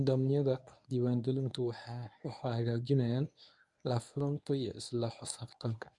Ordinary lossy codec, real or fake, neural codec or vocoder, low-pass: none; fake; codec, 24 kHz, 0.9 kbps, WavTokenizer, medium speech release version 1; 10.8 kHz